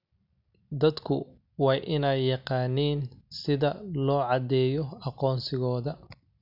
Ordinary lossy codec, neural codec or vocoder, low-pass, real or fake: none; none; 5.4 kHz; real